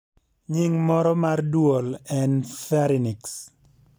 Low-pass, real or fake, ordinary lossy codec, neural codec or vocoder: none; real; none; none